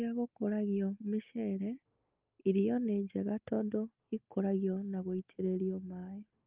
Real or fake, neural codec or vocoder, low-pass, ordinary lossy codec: real; none; 3.6 kHz; Opus, 32 kbps